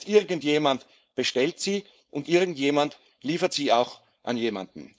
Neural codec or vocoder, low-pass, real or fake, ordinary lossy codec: codec, 16 kHz, 4.8 kbps, FACodec; none; fake; none